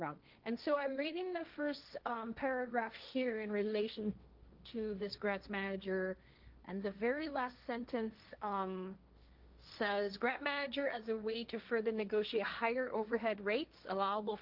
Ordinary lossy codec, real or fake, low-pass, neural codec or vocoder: Opus, 32 kbps; fake; 5.4 kHz; codec, 16 kHz, 1.1 kbps, Voila-Tokenizer